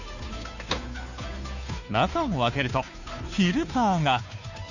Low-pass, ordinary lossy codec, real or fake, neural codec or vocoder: 7.2 kHz; none; fake; codec, 16 kHz, 2 kbps, FunCodec, trained on Chinese and English, 25 frames a second